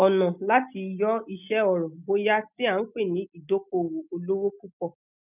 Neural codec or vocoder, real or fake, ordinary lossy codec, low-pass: none; real; none; 3.6 kHz